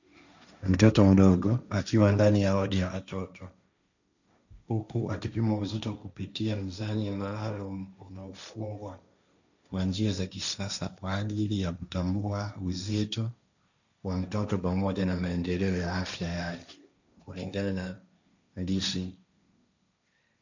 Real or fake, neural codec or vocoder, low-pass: fake; codec, 16 kHz, 1.1 kbps, Voila-Tokenizer; 7.2 kHz